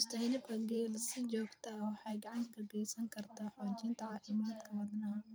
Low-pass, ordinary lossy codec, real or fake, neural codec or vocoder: none; none; fake; vocoder, 44.1 kHz, 128 mel bands every 512 samples, BigVGAN v2